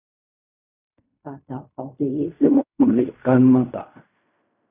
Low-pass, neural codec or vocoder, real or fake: 3.6 kHz; codec, 16 kHz in and 24 kHz out, 0.4 kbps, LongCat-Audio-Codec, fine tuned four codebook decoder; fake